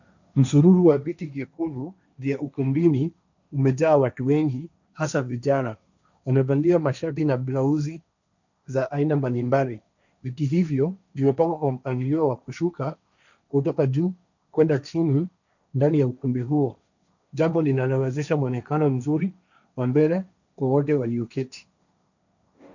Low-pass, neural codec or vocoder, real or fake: 7.2 kHz; codec, 16 kHz, 1.1 kbps, Voila-Tokenizer; fake